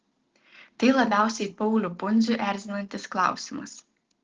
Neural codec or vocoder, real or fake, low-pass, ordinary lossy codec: none; real; 7.2 kHz; Opus, 16 kbps